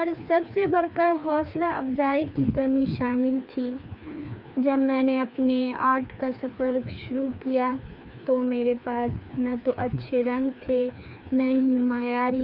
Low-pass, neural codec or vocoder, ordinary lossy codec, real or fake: 5.4 kHz; codec, 16 kHz, 2 kbps, FreqCodec, larger model; none; fake